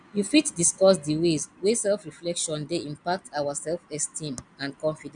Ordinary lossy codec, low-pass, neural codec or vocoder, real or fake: none; 9.9 kHz; none; real